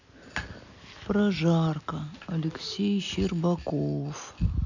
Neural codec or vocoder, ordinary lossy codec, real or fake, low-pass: none; none; real; 7.2 kHz